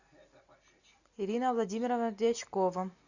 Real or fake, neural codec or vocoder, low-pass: real; none; 7.2 kHz